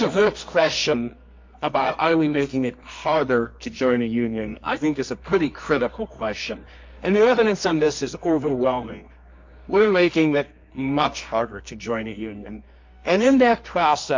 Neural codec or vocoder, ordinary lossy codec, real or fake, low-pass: codec, 24 kHz, 0.9 kbps, WavTokenizer, medium music audio release; MP3, 48 kbps; fake; 7.2 kHz